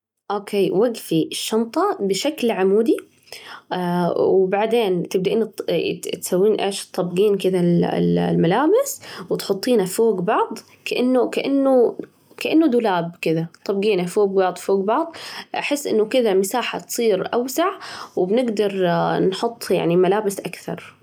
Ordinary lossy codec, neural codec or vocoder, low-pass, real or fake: none; none; 19.8 kHz; real